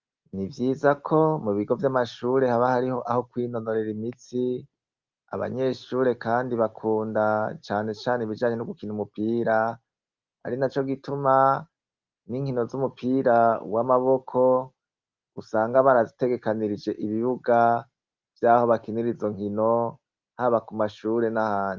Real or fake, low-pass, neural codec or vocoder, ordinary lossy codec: real; 7.2 kHz; none; Opus, 32 kbps